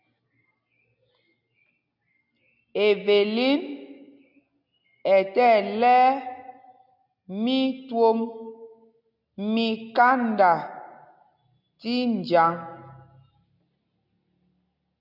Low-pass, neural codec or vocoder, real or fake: 5.4 kHz; none; real